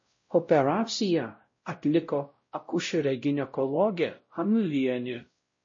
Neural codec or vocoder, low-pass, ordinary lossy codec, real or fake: codec, 16 kHz, 0.5 kbps, X-Codec, WavLM features, trained on Multilingual LibriSpeech; 7.2 kHz; MP3, 32 kbps; fake